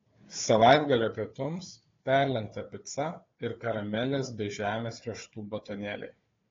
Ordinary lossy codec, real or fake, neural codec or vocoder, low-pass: AAC, 32 kbps; fake; codec, 16 kHz, 4 kbps, FunCodec, trained on Chinese and English, 50 frames a second; 7.2 kHz